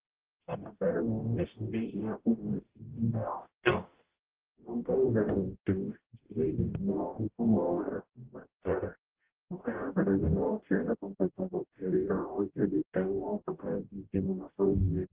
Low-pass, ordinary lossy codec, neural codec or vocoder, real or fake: 3.6 kHz; Opus, 16 kbps; codec, 44.1 kHz, 0.9 kbps, DAC; fake